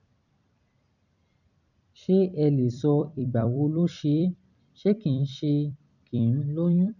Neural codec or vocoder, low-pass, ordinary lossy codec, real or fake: vocoder, 44.1 kHz, 128 mel bands every 256 samples, BigVGAN v2; 7.2 kHz; none; fake